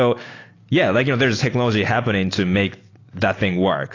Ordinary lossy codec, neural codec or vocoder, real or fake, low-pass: AAC, 32 kbps; none; real; 7.2 kHz